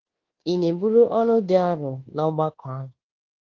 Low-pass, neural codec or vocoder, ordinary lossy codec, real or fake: 7.2 kHz; codec, 24 kHz, 1.2 kbps, DualCodec; Opus, 16 kbps; fake